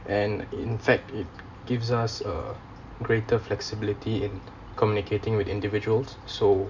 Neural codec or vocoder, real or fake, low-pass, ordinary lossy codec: none; real; 7.2 kHz; none